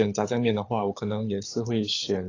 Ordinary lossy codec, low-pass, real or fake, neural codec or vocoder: AAC, 32 kbps; 7.2 kHz; fake; codec, 24 kHz, 6 kbps, HILCodec